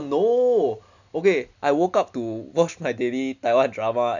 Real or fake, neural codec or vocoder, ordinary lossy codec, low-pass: real; none; none; 7.2 kHz